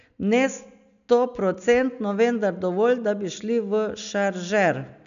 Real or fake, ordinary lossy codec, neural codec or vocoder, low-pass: real; none; none; 7.2 kHz